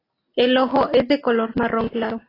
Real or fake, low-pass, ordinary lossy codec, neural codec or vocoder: real; 5.4 kHz; AAC, 24 kbps; none